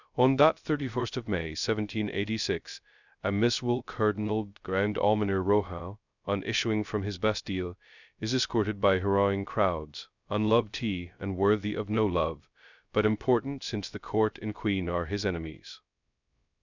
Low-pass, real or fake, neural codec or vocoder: 7.2 kHz; fake; codec, 16 kHz, 0.2 kbps, FocalCodec